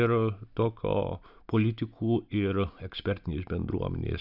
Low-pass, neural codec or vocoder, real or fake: 5.4 kHz; none; real